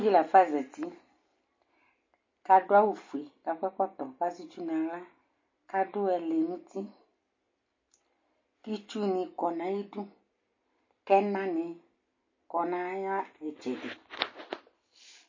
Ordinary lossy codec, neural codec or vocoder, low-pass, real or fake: MP3, 32 kbps; none; 7.2 kHz; real